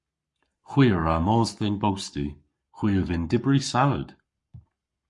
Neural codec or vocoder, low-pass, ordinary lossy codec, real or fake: codec, 44.1 kHz, 7.8 kbps, Pupu-Codec; 10.8 kHz; MP3, 64 kbps; fake